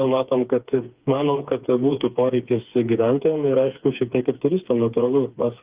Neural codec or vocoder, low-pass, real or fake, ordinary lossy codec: codec, 16 kHz, 4 kbps, FreqCodec, smaller model; 3.6 kHz; fake; Opus, 24 kbps